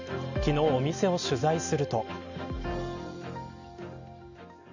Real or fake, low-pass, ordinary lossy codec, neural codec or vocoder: real; 7.2 kHz; none; none